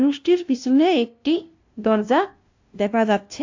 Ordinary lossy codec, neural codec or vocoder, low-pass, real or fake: AAC, 48 kbps; codec, 16 kHz, 0.5 kbps, FunCodec, trained on LibriTTS, 25 frames a second; 7.2 kHz; fake